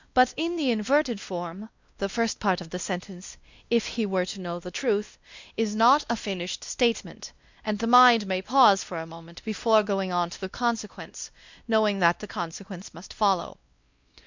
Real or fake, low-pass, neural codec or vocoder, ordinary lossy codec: fake; 7.2 kHz; codec, 16 kHz, 1 kbps, X-Codec, WavLM features, trained on Multilingual LibriSpeech; Opus, 64 kbps